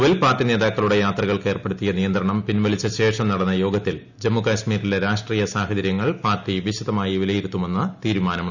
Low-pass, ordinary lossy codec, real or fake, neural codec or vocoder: 7.2 kHz; none; real; none